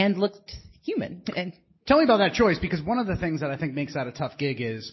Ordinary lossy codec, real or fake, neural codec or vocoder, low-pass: MP3, 24 kbps; real; none; 7.2 kHz